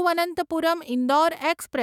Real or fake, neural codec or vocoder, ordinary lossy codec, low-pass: fake; autoencoder, 48 kHz, 128 numbers a frame, DAC-VAE, trained on Japanese speech; none; 19.8 kHz